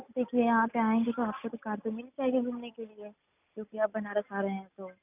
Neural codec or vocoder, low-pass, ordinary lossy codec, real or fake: none; 3.6 kHz; none; real